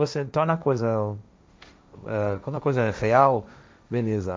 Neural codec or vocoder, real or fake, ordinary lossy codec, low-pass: codec, 16 kHz, 1.1 kbps, Voila-Tokenizer; fake; none; none